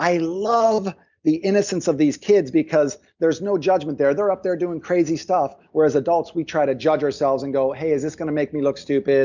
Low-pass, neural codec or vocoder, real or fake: 7.2 kHz; none; real